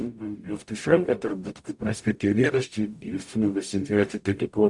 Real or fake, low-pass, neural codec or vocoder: fake; 10.8 kHz; codec, 44.1 kHz, 0.9 kbps, DAC